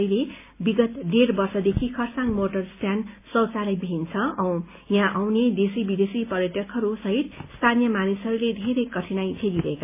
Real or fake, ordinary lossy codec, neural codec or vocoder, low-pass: real; MP3, 24 kbps; none; 3.6 kHz